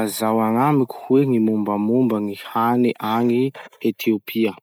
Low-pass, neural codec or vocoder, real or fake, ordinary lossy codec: none; none; real; none